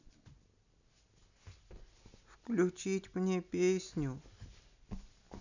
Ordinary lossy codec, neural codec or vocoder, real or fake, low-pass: none; none; real; 7.2 kHz